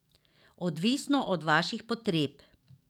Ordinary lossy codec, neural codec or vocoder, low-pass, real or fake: none; codec, 44.1 kHz, 7.8 kbps, DAC; 19.8 kHz; fake